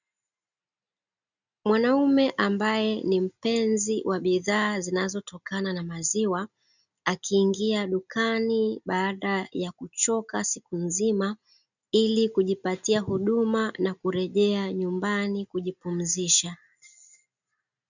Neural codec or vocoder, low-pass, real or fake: none; 7.2 kHz; real